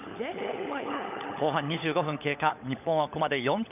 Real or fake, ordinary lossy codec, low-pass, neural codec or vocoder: fake; none; 3.6 kHz; codec, 16 kHz, 16 kbps, FunCodec, trained on LibriTTS, 50 frames a second